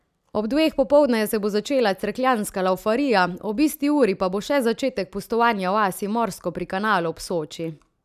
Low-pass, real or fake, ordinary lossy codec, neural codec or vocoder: 14.4 kHz; real; none; none